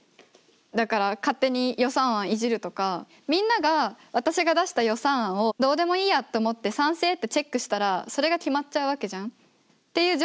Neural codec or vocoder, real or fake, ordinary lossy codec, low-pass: none; real; none; none